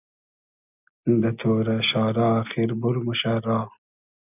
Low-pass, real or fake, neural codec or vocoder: 3.6 kHz; real; none